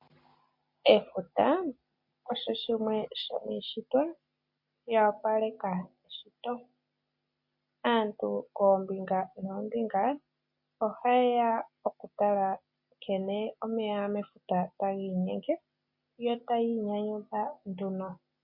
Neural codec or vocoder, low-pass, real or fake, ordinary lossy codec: none; 5.4 kHz; real; MP3, 32 kbps